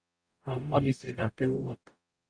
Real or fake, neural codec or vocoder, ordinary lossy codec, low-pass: fake; codec, 44.1 kHz, 0.9 kbps, DAC; Opus, 64 kbps; 9.9 kHz